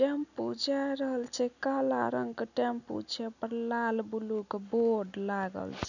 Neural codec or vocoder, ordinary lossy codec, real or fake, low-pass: none; none; real; 7.2 kHz